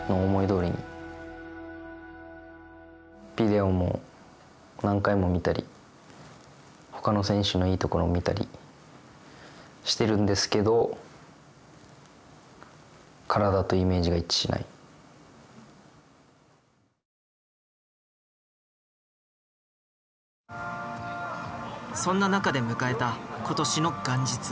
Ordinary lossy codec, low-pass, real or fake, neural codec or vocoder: none; none; real; none